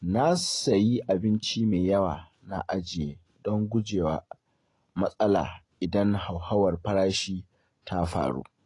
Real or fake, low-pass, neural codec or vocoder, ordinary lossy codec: real; 10.8 kHz; none; AAC, 32 kbps